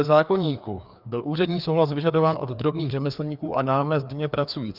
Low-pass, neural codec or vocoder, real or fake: 5.4 kHz; codec, 16 kHz, 2 kbps, FreqCodec, larger model; fake